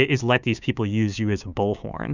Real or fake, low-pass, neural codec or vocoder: fake; 7.2 kHz; codec, 16 kHz, 6 kbps, DAC